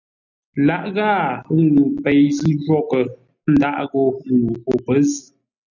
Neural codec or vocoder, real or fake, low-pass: none; real; 7.2 kHz